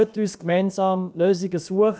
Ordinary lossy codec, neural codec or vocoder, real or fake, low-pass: none; codec, 16 kHz, about 1 kbps, DyCAST, with the encoder's durations; fake; none